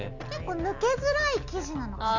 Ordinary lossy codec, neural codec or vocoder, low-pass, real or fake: Opus, 64 kbps; none; 7.2 kHz; real